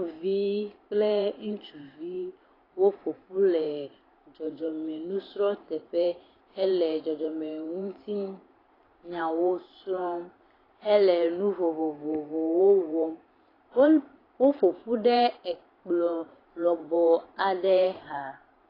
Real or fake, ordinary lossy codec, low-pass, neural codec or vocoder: fake; AAC, 24 kbps; 5.4 kHz; vocoder, 44.1 kHz, 128 mel bands every 512 samples, BigVGAN v2